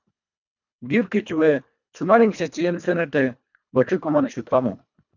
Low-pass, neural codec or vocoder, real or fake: 7.2 kHz; codec, 24 kHz, 1.5 kbps, HILCodec; fake